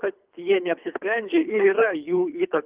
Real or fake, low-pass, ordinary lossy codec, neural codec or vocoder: fake; 3.6 kHz; Opus, 32 kbps; codec, 24 kHz, 3 kbps, HILCodec